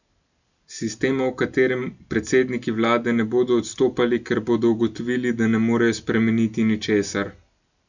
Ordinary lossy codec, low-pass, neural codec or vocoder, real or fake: none; 7.2 kHz; none; real